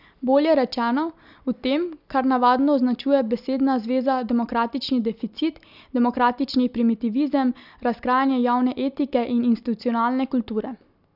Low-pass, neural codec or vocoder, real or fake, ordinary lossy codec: 5.4 kHz; none; real; none